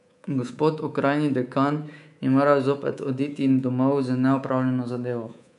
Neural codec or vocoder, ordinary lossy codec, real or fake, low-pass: codec, 24 kHz, 3.1 kbps, DualCodec; none; fake; 10.8 kHz